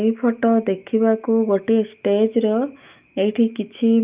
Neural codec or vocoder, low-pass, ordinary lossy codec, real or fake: autoencoder, 48 kHz, 128 numbers a frame, DAC-VAE, trained on Japanese speech; 3.6 kHz; Opus, 24 kbps; fake